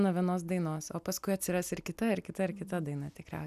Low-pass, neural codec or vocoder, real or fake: 14.4 kHz; none; real